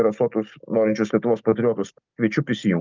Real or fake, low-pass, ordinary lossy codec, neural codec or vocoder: real; 7.2 kHz; Opus, 24 kbps; none